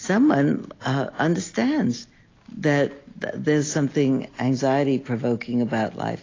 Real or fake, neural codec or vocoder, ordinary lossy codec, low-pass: real; none; AAC, 32 kbps; 7.2 kHz